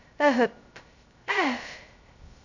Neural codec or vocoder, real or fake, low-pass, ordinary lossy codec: codec, 16 kHz, 0.2 kbps, FocalCodec; fake; 7.2 kHz; none